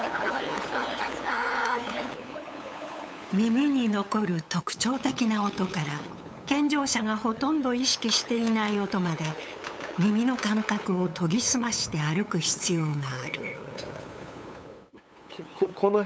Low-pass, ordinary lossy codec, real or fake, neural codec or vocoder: none; none; fake; codec, 16 kHz, 8 kbps, FunCodec, trained on LibriTTS, 25 frames a second